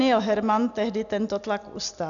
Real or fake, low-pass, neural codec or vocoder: real; 7.2 kHz; none